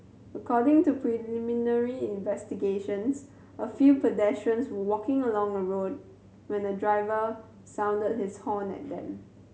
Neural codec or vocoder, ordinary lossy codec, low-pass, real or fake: none; none; none; real